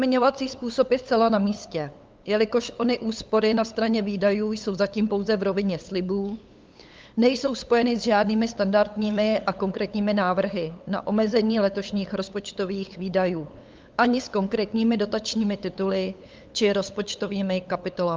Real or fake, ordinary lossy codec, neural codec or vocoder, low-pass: fake; Opus, 24 kbps; codec, 16 kHz, 8 kbps, FunCodec, trained on LibriTTS, 25 frames a second; 7.2 kHz